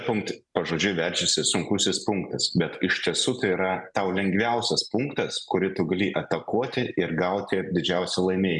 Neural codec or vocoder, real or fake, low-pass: none; real; 10.8 kHz